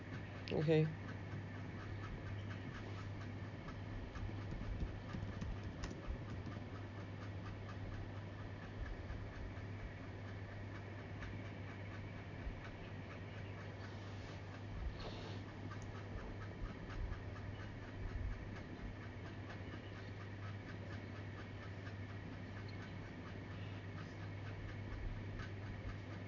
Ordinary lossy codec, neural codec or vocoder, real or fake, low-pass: none; none; real; 7.2 kHz